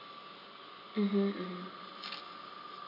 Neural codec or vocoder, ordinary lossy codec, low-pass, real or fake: none; MP3, 48 kbps; 5.4 kHz; real